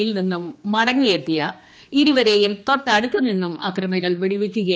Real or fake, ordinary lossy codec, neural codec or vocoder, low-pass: fake; none; codec, 16 kHz, 2 kbps, X-Codec, HuBERT features, trained on general audio; none